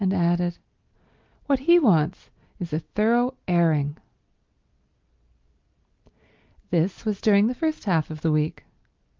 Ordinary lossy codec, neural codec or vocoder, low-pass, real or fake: Opus, 24 kbps; none; 7.2 kHz; real